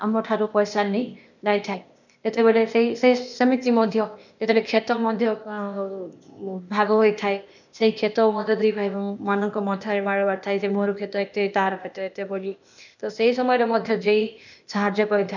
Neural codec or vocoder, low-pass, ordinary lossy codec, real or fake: codec, 16 kHz, 0.8 kbps, ZipCodec; 7.2 kHz; none; fake